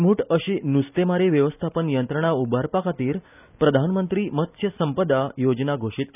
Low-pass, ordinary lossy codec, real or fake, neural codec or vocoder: 3.6 kHz; none; real; none